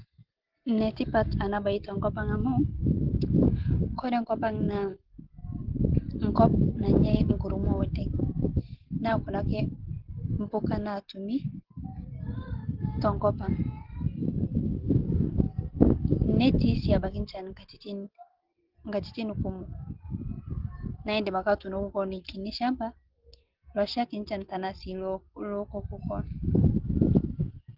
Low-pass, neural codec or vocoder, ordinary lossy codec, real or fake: 5.4 kHz; none; Opus, 16 kbps; real